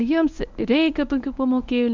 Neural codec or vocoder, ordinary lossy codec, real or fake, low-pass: codec, 24 kHz, 0.9 kbps, WavTokenizer, medium speech release version 1; MP3, 64 kbps; fake; 7.2 kHz